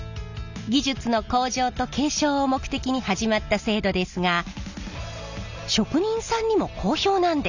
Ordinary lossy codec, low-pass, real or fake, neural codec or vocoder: none; 7.2 kHz; real; none